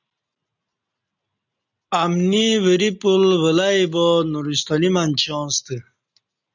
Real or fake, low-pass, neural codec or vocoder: real; 7.2 kHz; none